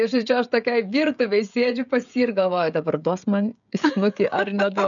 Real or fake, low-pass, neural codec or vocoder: fake; 7.2 kHz; codec, 16 kHz, 16 kbps, FreqCodec, smaller model